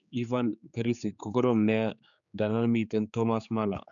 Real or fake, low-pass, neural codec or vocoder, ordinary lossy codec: fake; 7.2 kHz; codec, 16 kHz, 4 kbps, X-Codec, HuBERT features, trained on general audio; none